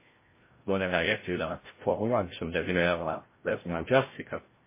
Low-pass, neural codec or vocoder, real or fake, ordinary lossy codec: 3.6 kHz; codec, 16 kHz, 0.5 kbps, FreqCodec, larger model; fake; MP3, 16 kbps